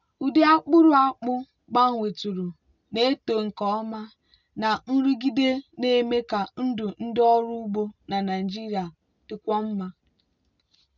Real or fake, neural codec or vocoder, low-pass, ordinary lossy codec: real; none; 7.2 kHz; none